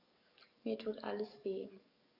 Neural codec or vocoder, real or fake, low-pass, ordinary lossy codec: codec, 44.1 kHz, 7.8 kbps, DAC; fake; 5.4 kHz; none